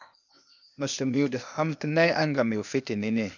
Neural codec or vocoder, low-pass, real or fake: codec, 16 kHz, 0.8 kbps, ZipCodec; 7.2 kHz; fake